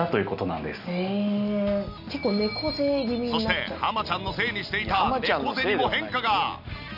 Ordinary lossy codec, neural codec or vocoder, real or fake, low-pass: none; none; real; 5.4 kHz